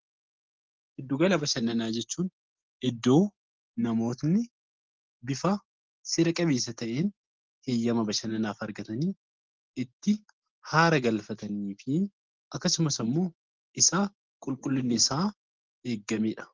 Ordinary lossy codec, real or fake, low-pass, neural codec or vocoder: Opus, 16 kbps; real; 7.2 kHz; none